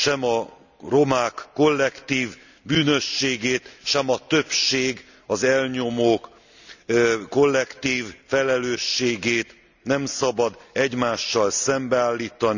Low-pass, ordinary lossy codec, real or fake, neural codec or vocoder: 7.2 kHz; none; real; none